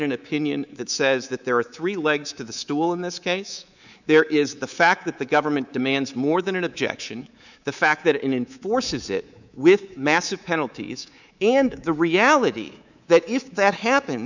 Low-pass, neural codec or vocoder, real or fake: 7.2 kHz; codec, 24 kHz, 3.1 kbps, DualCodec; fake